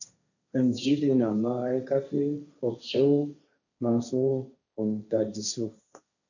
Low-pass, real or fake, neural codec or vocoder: 7.2 kHz; fake; codec, 16 kHz, 1.1 kbps, Voila-Tokenizer